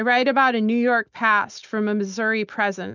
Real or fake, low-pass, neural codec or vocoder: real; 7.2 kHz; none